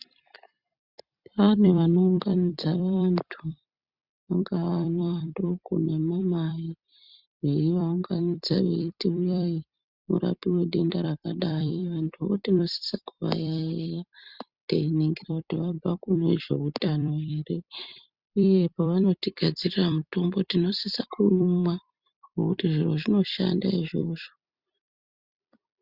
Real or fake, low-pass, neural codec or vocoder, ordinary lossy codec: fake; 5.4 kHz; vocoder, 44.1 kHz, 128 mel bands every 256 samples, BigVGAN v2; Opus, 64 kbps